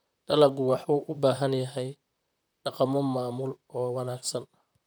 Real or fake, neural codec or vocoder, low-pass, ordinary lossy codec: fake; vocoder, 44.1 kHz, 128 mel bands, Pupu-Vocoder; none; none